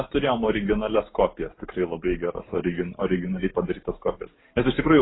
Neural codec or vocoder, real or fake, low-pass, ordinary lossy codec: none; real; 7.2 kHz; AAC, 16 kbps